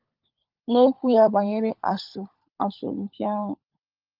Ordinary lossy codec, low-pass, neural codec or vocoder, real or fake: Opus, 32 kbps; 5.4 kHz; codec, 16 kHz, 16 kbps, FunCodec, trained on LibriTTS, 50 frames a second; fake